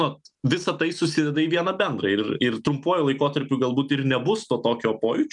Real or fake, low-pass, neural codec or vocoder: real; 10.8 kHz; none